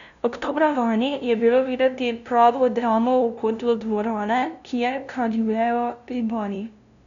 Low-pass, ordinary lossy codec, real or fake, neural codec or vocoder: 7.2 kHz; none; fake; codec, 16 kHz, 0.5 kbps, FunCodec, trained on LibriTTS, 25 frames a second